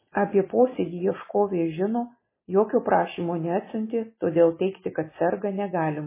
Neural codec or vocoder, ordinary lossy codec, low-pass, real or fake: none; MP3, 16 kbps; 3.6 kHz; real